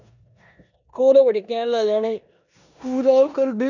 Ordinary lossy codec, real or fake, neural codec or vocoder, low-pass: none; fake; codec, 16 kHz in and 24 kHz out, 0.9 kbps, LongCat-Audio-Codec, four codebook decoder; 7.2 kHz